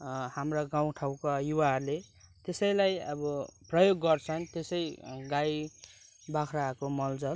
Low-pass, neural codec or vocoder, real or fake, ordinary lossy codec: none; none; real; none